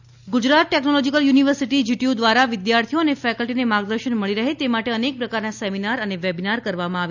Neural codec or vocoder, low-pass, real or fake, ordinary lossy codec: none; 7.2 kHz; real; none